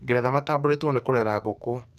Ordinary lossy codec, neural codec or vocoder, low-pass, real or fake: none; codec, 44.1 kHz, 2.6 kbps, SNAC; 14.4 kHz; fake